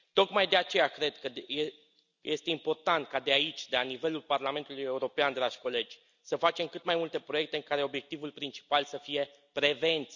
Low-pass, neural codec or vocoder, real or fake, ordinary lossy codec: 7.2 kHz; none; real; none